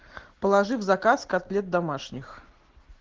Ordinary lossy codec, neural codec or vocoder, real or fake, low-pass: Opus, 16 kbps; none; real; 7.2 kHz